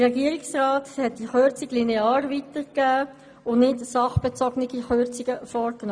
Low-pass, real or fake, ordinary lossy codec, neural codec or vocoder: 9.9 kHz; real; none; none